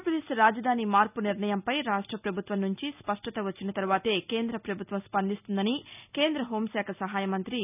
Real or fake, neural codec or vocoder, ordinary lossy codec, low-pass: real; none; none; 3.6 kHz